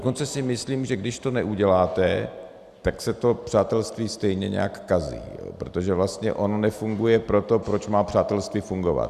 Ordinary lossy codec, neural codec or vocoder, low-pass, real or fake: Opus, 64 kbps; none; 14.4 kHz; real